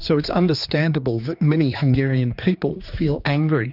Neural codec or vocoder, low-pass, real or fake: codec, 16 kHz, 2 kbps, X-Codec, HuBERT features, trained on general audio; 5.4 kHz; fake